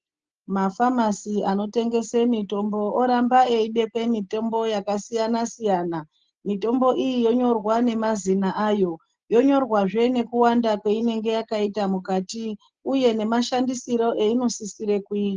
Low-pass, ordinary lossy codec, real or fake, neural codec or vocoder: 7.2 kHz; Opus, 16 kbps; real; none